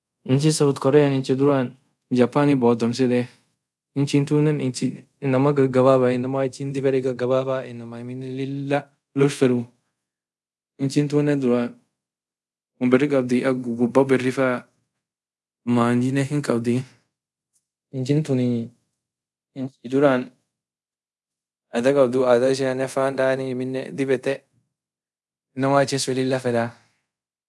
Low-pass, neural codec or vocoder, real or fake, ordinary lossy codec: none; codec, 24 kHz, 0.5 kbps, DualCodec; fake; none